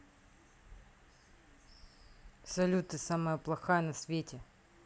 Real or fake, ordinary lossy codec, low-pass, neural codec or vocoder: real; none; none; none